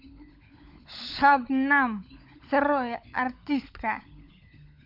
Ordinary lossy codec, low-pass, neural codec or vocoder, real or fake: MP3, 48 kbps; 5.4 kHz; codec, 16 kHz, 16 kbps, FunCodec, trained on LibriTTS, 50 frames a second; fake